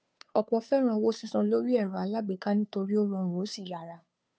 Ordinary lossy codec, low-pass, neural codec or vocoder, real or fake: none; none; codec, 16 kHz, 2 kbps, FunCodec, trained on Chinese and English, 25 frames a second; fake